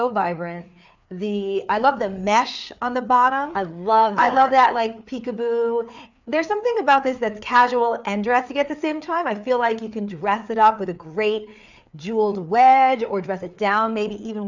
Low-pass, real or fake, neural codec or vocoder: 7.2 kHz; fake; codec, 16 kHz, 4 kbps, FreqCodec, larger model